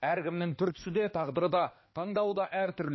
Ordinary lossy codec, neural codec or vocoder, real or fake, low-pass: MP3, 24 kbps; codec, 16 kHz, 2 kbps, X-Codec, HuBERT features, trained on balanced general audio; fake; 7.2 kHz